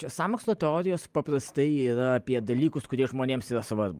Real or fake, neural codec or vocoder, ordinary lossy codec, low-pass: fake; vocoder, 44.1 kHz, 128 mel bands every 512 samples, BigVGAN v2; Opus, 32 kbps; 14.4 kHz